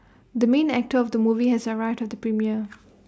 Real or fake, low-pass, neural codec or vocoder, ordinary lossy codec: real; none; none; none